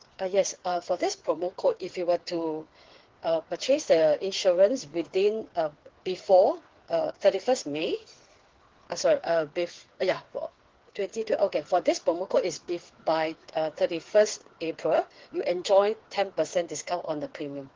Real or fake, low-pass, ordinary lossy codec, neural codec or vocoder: fake; 7.2 kHz; Opus, 16 kbps; codec, 16 kHz, 4 kbps, FreqCodec, smaller model